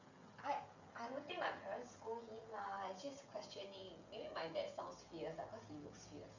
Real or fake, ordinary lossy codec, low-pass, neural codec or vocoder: fake; none; 7.2 kHz; codec, 16 kHz, 16 kbps, FreqCodec, smaller model